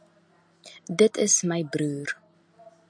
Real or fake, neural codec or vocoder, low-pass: real; none; 9.9 kHz